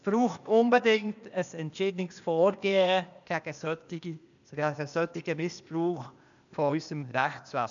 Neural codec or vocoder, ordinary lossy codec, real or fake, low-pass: codec, 16 kHz, 0.8 kbps, ZipCodec; none; fake; 7.2 kHz